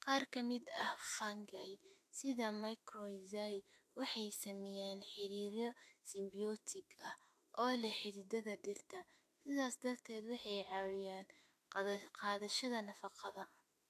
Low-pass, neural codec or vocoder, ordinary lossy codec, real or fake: 14.4 kHz; autoencoder, 48 kHz, 32 numbers a frame, DAC-VAE, trained on Japanese speech; AAC, 64 kbps; fake